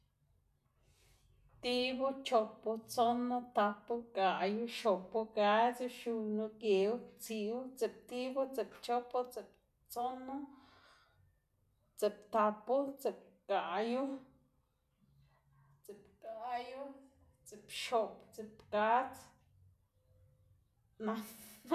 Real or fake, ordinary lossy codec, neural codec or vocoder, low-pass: real; none; none; 14.4 kHz